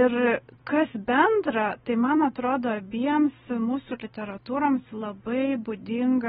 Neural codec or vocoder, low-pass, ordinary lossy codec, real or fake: none; 9.9 kHz; AAC, 16 kbps; real